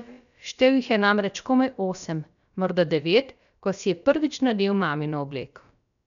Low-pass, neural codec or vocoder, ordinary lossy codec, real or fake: 7.2 kHz; codec, 16 kHz, about 1 kbps, DyCAST, with the encoder's durations; none; fake